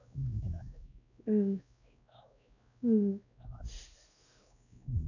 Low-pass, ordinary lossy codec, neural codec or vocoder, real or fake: 7.2 kHz; none; codec, 16 kHz, 1 kbps, X-Codec, WavLM features, trained on Multilingual LibriSpeech; fake